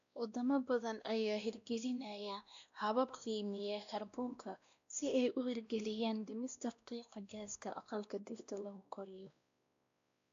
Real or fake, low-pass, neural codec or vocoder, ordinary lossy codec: fake; 7.2 kHz; codec, 16 kHz, 1 kbps, X-Codec, WavLM features, trained on Multilingual LibriSpeech; AAC, 64 kbps